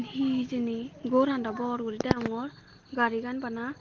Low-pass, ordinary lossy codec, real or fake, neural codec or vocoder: 7.2 kHz; Opus, 16 kbps; real; none